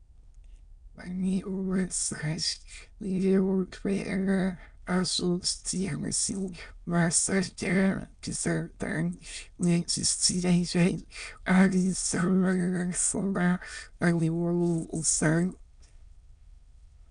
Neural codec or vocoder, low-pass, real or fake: autoencoder, 22.05 kHz, a latent of 192 numbers a frame, VITS, trained on many speakers; 9.9 kHz; fake